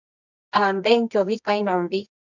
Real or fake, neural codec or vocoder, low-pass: fake; codec, 24 kHz, 0.9 kbps, WavTokenizer, medium music audio release; 7.2 kHz